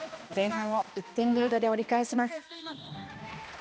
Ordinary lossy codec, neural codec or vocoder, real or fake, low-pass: none; codec, 16 kHz, 1 kbps, X-Codec, HuBERT features, trained on balanced general audio; fake; none